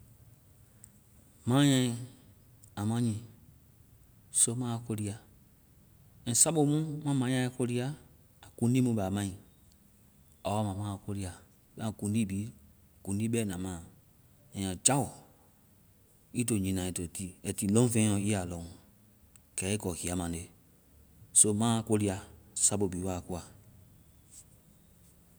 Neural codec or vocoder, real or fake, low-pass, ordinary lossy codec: none; real; none; none